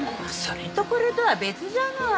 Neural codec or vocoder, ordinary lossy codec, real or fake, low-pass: none; none; real; none